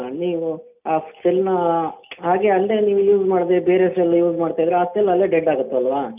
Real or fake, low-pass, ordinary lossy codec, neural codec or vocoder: real; 3.6 kHz; none; none